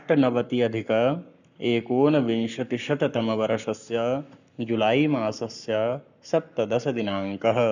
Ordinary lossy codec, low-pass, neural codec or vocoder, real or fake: none; 7.2 kHz; codec, 44.1 kHz, 7.8 kbps, Pupu-Codec; fake